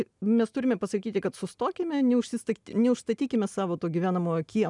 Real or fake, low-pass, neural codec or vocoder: real; 10.8 kHz; none